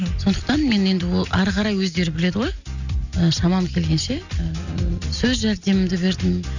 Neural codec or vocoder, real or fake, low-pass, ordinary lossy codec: none; real; 7.2 kHz; none